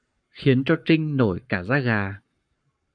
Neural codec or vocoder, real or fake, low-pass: codec, 44.1 kHz, 7.8 kbps, Pupu-Codec; fake; 9.9 kHz